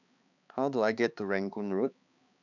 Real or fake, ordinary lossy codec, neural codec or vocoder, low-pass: fake; none; codec, 16 kHz, 4 kbps, X-Codec, HuBERT features, trained on balanced general audio; 7.2 kHz